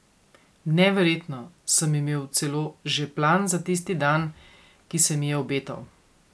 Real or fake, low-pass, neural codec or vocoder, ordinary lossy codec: real; none; none; none